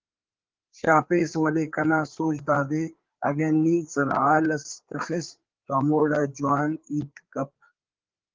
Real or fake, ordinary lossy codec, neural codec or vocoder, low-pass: fake; Opus, 16 kbps; codec, 16 kHz, 4 kbps, FreqCodec, larger model; 7.2 kHz